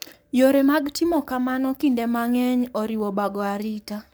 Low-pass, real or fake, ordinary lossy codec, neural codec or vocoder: none; fake; none; codec, 44.1 kHz, 7.8 kbps, Pupu-Codec